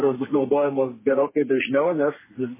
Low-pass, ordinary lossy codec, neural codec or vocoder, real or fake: 3.6 kHz; MP3, 16 kbps; codec, 32 kHz, 1.9 kbps, SNAC; fake